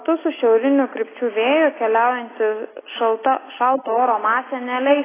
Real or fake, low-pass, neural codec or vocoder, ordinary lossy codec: real; 3.6 kHz; none; AAC, 16 kbps